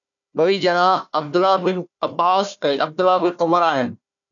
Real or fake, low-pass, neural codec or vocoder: fake; 7.2 kHz; codec, 16 kHz, 1 kbps, FunCodec, trained on Chinese and English, 50 frames a second